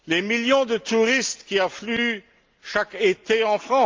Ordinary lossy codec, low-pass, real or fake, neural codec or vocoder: Opus, 32 kbps; 7.2 kHz; real; none